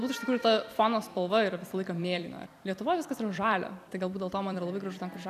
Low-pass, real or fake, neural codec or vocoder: 14.4 kHz; fake; vocoder, 44.1 kHz, 128 mel bands every 256 samples, BigVGAN v2